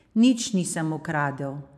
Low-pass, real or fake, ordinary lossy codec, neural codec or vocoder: 14.4 kHz; fake; none; vocoder, 44.1 kHz, 128 mel bands every 512 samples, BigVGAN v2